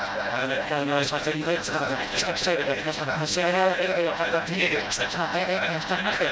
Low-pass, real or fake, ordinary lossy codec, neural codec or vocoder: none; fake; none; codec, 16 kHz, 0.5 kbps, FreqCodec, smaller model